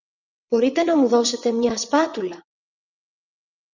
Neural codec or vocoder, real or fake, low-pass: vocoder, 22.05 kHz, 80 mel bands, WaveNeXt; fake; 7.2 kHz